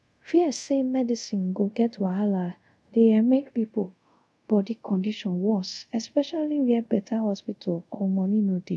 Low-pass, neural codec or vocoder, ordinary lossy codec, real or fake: none; codec, 24 kHz, 0.5 kbps, DualCodec; none; fake